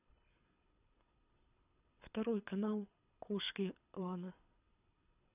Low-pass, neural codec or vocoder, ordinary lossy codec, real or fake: 3.6 kHz; codec, 24 kHz, 6 kbps, HILCodec; none; fake